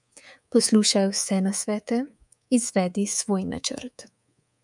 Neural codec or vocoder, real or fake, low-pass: codec, 24 kHz, 3.1 kbps, DualCodec; fake; 10.8 kHz